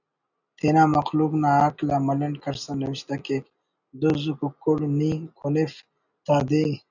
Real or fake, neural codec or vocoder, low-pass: real; none; 7.2 kHz